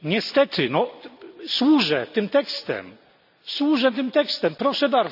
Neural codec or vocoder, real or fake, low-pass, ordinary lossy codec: none; real; 5.4 kHz; none